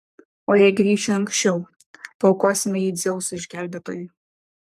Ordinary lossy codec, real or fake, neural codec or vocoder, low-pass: AAC, 96 kbps; fake; codec, 44.1 kHz, 3.4 kbps, Pupu-Codec; 14.4 kHz